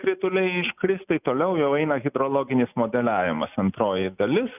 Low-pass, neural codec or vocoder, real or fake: 3.6 kHz; none; real